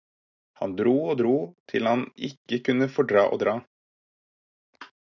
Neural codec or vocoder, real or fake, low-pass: none; real; 7.2 kHz